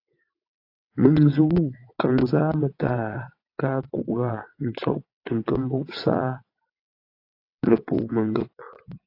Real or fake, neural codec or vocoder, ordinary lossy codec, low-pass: fake; vocoder, 22.05 kHz, 80 mel bands, WaveNeXt; AAC, 32 kbps; 5.4 kHz